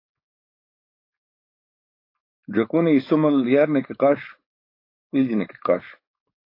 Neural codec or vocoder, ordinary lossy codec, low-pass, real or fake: codec, 16 kHz, 4.8 kbps, FACodec; AAC, 32 kbps; 5.4 kHz; fake